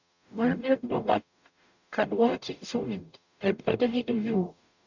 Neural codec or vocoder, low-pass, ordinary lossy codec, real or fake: codec, 44.1 kHz, 0.9 kbps, DAC; 7.2 kHz; none; fake